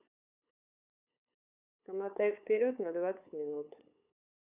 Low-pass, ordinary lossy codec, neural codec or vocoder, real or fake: 3.6 kHz; none; codec, 16 kHz, 8 kbps, FunCodec, trained on LibriTTS, 25 frames a second; fake